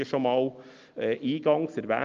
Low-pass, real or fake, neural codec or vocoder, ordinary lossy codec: 7.2 kHz; real; none; Opus, 32 kbps